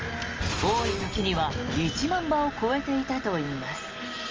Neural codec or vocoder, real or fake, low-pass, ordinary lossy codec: none; real; 7.2 kHz; Opus, 24 kbps